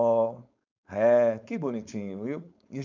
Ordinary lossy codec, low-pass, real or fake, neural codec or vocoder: MP3, 64 kbps; 7.2 kHz; fake; codec, 16 kHz, 4.8 kbps, FACodec